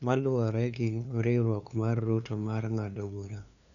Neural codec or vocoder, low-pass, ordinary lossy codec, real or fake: codec, 16 kHz, 2 kbps, FunCodec, trained on LibriTTS, 25 frames a second; 7.2 kHz; none; fake